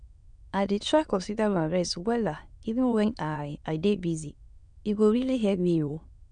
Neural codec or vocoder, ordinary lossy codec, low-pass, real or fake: autoencoder, 22.05 kHz, a latent of 192 numbers a frame, VITS, trained on many speakers; none; 9.9 kHz; fake